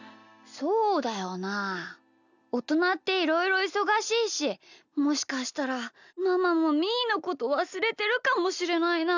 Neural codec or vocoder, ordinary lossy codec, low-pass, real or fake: none; none; 7.2 kHz; real